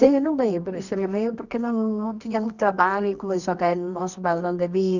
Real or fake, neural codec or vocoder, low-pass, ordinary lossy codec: fake; codec, 24 kHz, 0.9 kbps, WavTokenizer, medium music audio release; 7.2 kHz; none